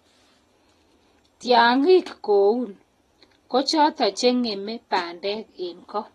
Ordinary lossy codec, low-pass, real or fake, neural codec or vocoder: AAC, 32 kbps; 19.8 kHz; real; none